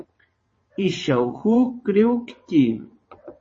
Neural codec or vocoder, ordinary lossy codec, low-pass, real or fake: vocoder, 22.05 kHz, 80 mel bands, WaveNeXt; MP3, 32 kbps; 9.9 kHz; fake